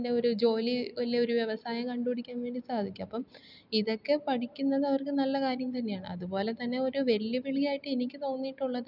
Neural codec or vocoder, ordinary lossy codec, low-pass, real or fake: none; none; 5.4 kHz; real